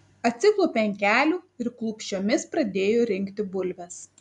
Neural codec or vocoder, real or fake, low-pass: none; real; 10.8 kHz